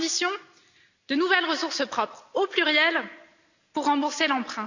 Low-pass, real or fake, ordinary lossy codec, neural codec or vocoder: 7.2 kHz; real; none; none